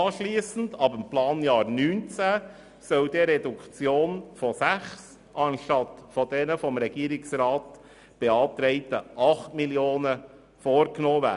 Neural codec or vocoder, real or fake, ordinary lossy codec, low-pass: none; real; none; 10.8 kHz